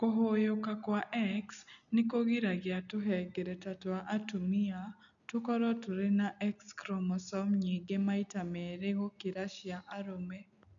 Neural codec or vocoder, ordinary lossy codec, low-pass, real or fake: none; none; 7.2 kHz; real